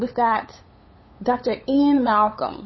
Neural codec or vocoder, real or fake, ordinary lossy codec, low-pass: codec, 16 kHz, 8 kbps, FunCodec, trained on LibriTTS, 25 frames a second; fake; MP3, 24 kbps; 7.2 kHz